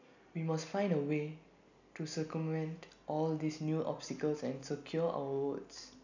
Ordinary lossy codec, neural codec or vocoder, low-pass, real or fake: none; none; 7.2 kHz; real